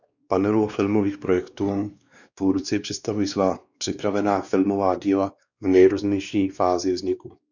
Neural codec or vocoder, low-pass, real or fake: codec, 16 kHz, 2 kbps, X-Codec, WavLM features, trained on Multilingual LibriSpeech; 7.2 kHz; fake